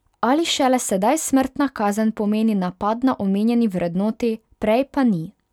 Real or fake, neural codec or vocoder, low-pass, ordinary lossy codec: real; none; 19.8 kHz; none